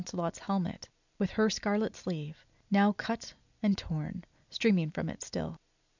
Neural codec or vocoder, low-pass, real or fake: none; 7.2 kHz; real